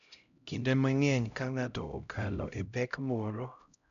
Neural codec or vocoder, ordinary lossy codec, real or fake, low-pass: codec, 16 kHz, 0.5 kbps, X-Codec, HuBERT features, trained on LibriSpeech; none; fake; 7.2 kHz